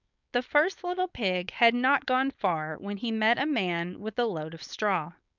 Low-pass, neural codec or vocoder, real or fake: 7.2 kHz; codec, 16 kHz, 4.8 kbps, FACodec; fake